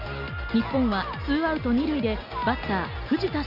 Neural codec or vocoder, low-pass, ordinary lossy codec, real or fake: none; 5.4 kHz; none; real